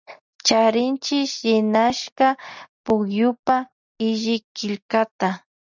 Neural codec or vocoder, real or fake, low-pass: none; real; 7.2 kHz